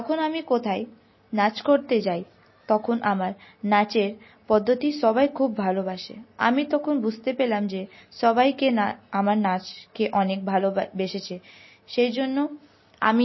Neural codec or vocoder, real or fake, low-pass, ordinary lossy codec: none; real; 7.2 kHz; MP3, 24 kbps